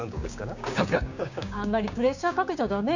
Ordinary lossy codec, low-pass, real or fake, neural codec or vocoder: none; 7.2 kHz; fake; codec, 16 kHz, 6 kbps, DAC